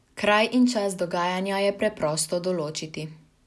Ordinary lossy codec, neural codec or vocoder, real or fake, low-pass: none; none; real; none